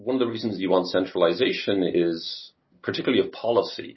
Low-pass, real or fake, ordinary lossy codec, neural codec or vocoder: 7.2 kHz; real; MP3, 24 kbps; none